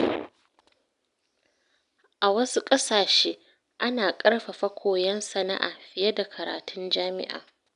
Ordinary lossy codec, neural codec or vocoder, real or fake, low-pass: none; none; real; 10.8 kHz